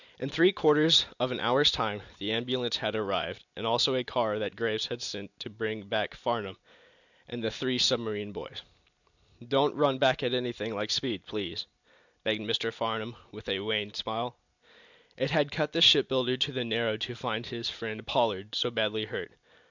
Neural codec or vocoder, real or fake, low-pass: none; real; 7.2 kHz